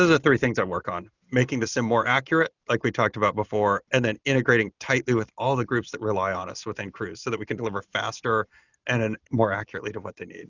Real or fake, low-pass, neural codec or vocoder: real; 7.2 kHz; none